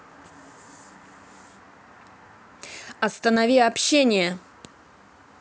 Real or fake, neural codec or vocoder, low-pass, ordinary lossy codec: real; none; none; none